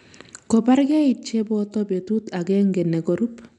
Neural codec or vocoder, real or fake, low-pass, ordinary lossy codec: none; real; 10.8 kHz; none